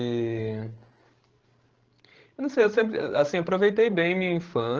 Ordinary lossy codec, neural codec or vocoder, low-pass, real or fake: Opus, 16 kbps; codec, 16 kHz, 16 kbps, FreqCodec, larger model; 7.2 kHz; fake